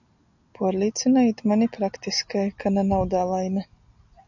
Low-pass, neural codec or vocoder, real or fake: 7.2 kHz; none; real